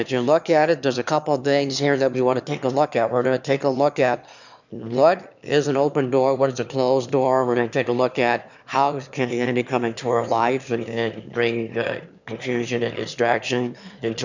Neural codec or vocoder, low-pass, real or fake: autoencoder, 22.05 kHz, a latent of 192 numbers a frame, VITS, trained on one speaker; 7.2 kHz; fake